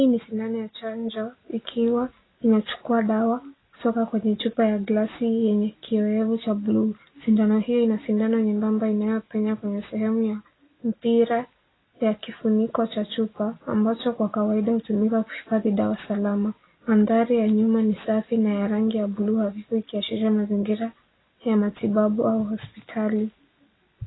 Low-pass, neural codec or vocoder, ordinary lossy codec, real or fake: 7.2 kHz; none; AAC, 16 kbps; real